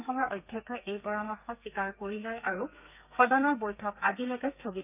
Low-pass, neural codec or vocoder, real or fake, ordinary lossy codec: 3.6 kHz; codec, 32 kHz, 1.9 kbps, SNAC; fake; none